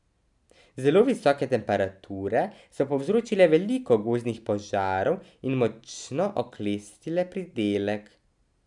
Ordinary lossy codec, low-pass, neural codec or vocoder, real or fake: none; 10.8 kHz; none; real